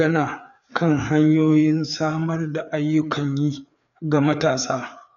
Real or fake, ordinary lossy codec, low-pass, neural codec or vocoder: fake; none; 7.2 kHz; codec, 16 kHz, 4 kbps, FreqCodec, larger model